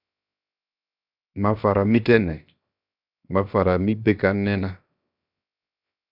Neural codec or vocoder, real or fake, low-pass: codec, 16 kHz, 0.7 kbps, FocalCodec; fake; 5.4 kHz